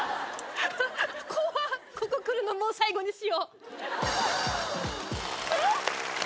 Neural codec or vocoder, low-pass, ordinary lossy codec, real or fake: none; none; none; real